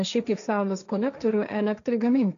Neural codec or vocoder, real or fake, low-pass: codec, 16 kHz, 1.1 kbps, Voila-Tokenizer; fake; 7.2 kHz